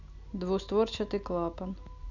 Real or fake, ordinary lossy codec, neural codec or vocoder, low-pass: real; none; none; 7.2 kHz